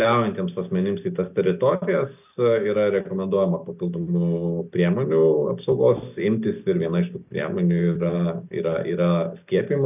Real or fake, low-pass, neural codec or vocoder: real; 3.6 kHz; none